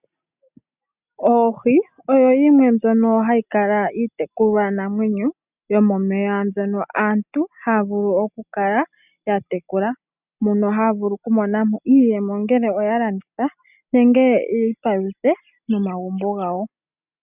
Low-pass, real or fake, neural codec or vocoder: 3.6 kHz; real; none